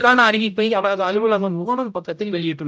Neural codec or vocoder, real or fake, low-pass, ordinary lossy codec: codec, 16 kHz, 0.5 kbps, X-Codec, HuBERT features, trained on general audio; fake; none; none